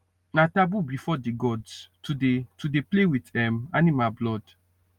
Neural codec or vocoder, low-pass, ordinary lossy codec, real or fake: none; 19.8 kHz; Opus, 32 kbps; real